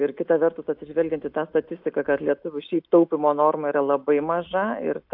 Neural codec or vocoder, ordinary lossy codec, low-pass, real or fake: none; AAC, 48 kbps; 5.4 kHz; real